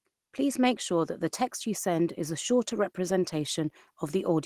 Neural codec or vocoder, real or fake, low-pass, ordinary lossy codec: none; real; 14.4 kHz; Opus, 16 kbps